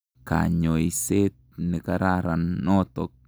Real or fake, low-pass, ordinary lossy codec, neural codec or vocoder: real; none; none; none